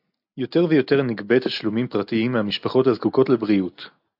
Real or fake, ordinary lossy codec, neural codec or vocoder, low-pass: real; AAC, 48 kbps; none; 5.4 kHz